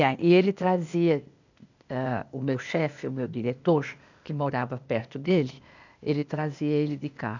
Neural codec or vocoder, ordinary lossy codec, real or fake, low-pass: codec, 16 kHz, 0.8 kbps, ZipCodec; none; fake; 7.2 kHz